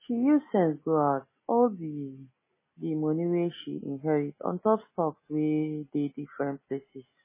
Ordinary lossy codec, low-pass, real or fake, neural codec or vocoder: MP3, 16 kbps; 3.6 kHz; real; none